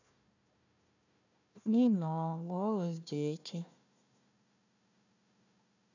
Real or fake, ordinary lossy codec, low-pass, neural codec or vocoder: fake; none; 7.2 kHz; codec, 16 kHz, 1 kbps, FunCodec, trained on Chinese and English, 50 frames a second